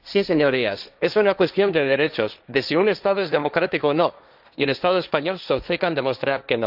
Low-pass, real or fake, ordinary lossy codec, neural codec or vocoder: 5.4 kHz; fake; none; codec, 16 kHz, 1.1 kbps, Voila-Tokenizer